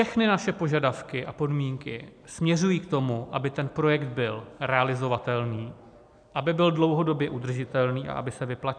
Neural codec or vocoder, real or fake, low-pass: none; real; 9.9 kHz